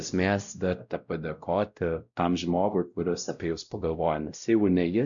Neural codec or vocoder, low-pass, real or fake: codec, 16 kHz, 0.5 kbps, X-Codec, WavLM features, trained on Multilingual LibriSpeech; 7.2 kHz; fake